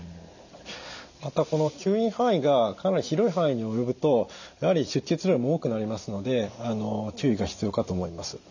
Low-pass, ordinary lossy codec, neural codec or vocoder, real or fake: 7.2 kHz; none; none; real